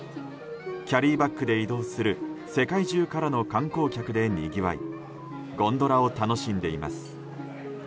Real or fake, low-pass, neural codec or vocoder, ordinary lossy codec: real; none; none; none